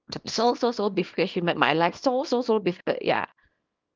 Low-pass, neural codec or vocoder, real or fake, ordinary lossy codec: 7.2 kHz; codec, 24 kHz, 0.9 kbps, WavTokenizer, small release; fake; Opus, 32 kbps